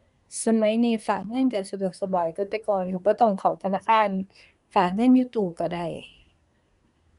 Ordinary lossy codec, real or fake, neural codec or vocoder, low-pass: none; fake; codec, 24 kHz, 1 kbps, SNAC; 10.8 kHz